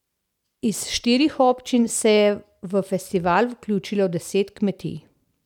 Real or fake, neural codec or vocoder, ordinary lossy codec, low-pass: real; none; none; 19.8 kHz